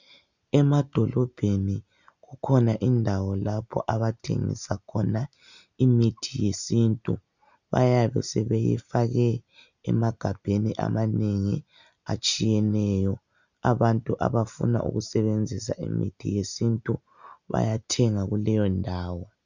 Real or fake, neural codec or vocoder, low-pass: real; none; 7.2 kHz